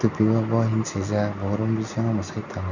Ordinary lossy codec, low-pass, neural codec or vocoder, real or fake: none; 7.2 kHz; none; real